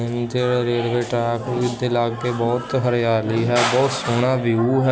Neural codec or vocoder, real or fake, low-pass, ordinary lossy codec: none; real; none; none